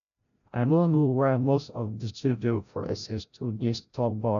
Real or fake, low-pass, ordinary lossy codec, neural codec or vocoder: fake; 7.2 kHz; MP3, 96 kbps; codec, 16 kHz, 0.5 kbps, FreqCodec, larger model